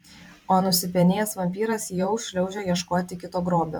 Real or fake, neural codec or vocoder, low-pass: fake; vocoder, 44.1 kHz, 128 mel bands every 512 samples, BigVGAN v2; 19.8 kHz